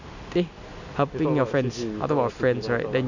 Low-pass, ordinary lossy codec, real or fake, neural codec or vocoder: 7.2 kHz; none; real; none